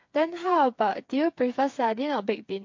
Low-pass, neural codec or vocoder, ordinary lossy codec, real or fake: 7.2 kHz; codec, 16 kHz, 4 kbps, FreqCodec, smaller model; MP3, 48 kbps; fake